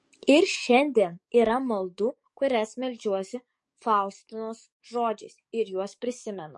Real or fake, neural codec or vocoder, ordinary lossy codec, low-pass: fake; codec, 44.1 kHz, 7.8 kbps, DAC; MP3, 48 kbps; 10.8 kHz